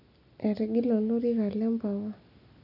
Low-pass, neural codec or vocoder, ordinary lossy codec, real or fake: 5.4 kHz; none; none; real